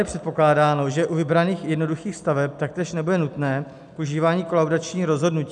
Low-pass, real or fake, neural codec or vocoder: 10.8 kHz; real; none